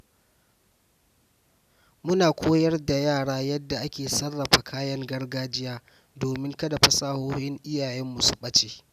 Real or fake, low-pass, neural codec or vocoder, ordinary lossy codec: real; 14.4 kHz; none; none